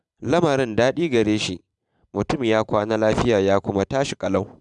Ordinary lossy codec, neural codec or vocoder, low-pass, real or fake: none; vocoder, 44.1 kHz, 128 mel bands every 512 samples, BigVGAN v2; 10.8 kHz; fake